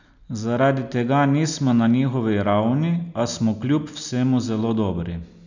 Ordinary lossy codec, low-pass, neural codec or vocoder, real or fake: none; 7.2 kHz; none; real